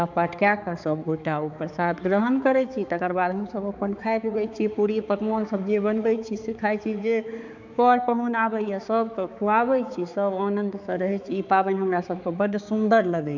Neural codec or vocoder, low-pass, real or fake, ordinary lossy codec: codec, 16 kHz, 4 kbps, X-Codec, HuBERT features, trained on balanced general audio; 7.2 kHz; fake; none